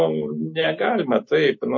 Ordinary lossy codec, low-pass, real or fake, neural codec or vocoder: MP3, 32 kbps; 7.2 kHz; real; none